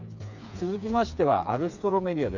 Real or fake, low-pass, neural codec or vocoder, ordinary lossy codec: fake; 7.2 kHz; codec, 16 kHz, 4 kbps, FreqCodec, smaller model; none